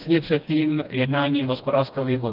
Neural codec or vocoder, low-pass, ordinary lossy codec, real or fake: codec, 16 kHz, 1 kbps, FreqCodec, smaller model; 5.4 kHz; Opus, 24 kbps; fake